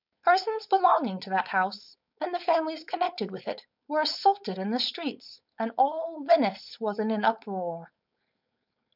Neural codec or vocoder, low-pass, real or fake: codec, 16 kHz, 4.8 kbps, FACodec; 5.4 kHz; fake